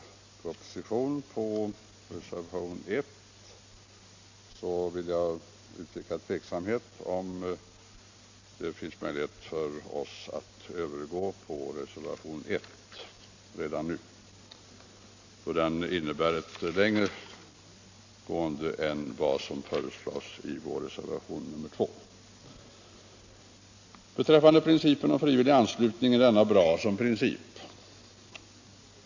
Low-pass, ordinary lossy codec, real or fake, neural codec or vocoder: 7.2 kHz; AAC, 48 kbps; real; none